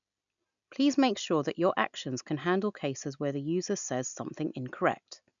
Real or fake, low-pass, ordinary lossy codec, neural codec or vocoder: real; 7.2 kHz; none; none